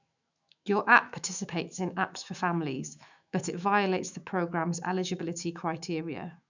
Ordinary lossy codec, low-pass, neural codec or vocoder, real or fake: none; 7.2 kHz; autoencoder, 48 kHz, 128 numbers a frame, DAC-VAE, trained on Japanese speech; fake